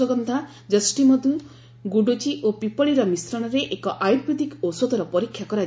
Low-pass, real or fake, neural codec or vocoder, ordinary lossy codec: none; real; none; none